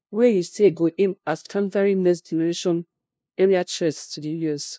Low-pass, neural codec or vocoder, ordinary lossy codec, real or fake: none; codec, 16 kHz, 0.5 kbps, FunCodec, trained on LibriTTS, 25 frames a second; none; fake